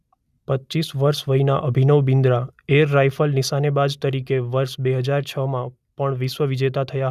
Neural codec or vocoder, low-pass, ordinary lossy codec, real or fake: none; 14.4 kHz; none; real